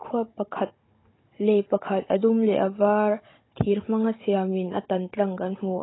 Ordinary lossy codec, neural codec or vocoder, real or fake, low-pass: AAC, 16 kbps; codec, 16 kHz, 16 kbps, FunCodec, trained on Chinese and English, 50 frames a second; fake; 7.2 kHz